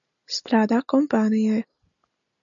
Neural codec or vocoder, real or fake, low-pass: none; real; 7.2 kHz